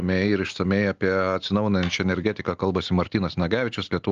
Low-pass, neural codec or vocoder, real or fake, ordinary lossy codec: 7.2 kHz; none; real; Opus, 32 kbps